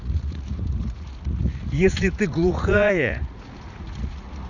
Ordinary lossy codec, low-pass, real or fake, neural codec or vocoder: none; 7.2 kHz; fake; vocoder, 44.1 kHz, 80 mel bands, Vocos